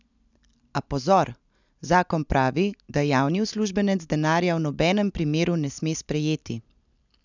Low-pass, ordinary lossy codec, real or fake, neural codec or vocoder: 7.2 kHz; none; real; none